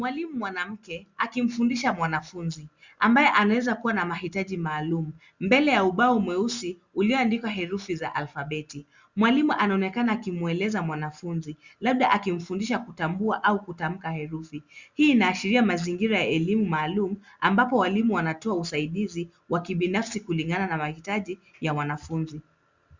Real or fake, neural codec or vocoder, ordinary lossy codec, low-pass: real; none; Opus, 64 kbps; 7.2 kHz